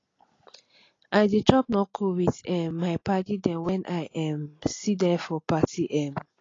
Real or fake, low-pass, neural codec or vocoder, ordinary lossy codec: real; 7.2 kHz; none; AAC, 32 kbps